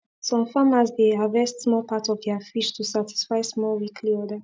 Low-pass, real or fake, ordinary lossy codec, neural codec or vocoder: none; real; none; none